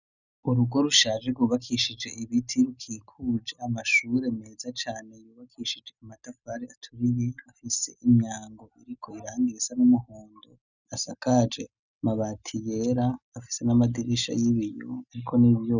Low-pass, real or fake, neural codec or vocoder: 7.2 kHz; real; none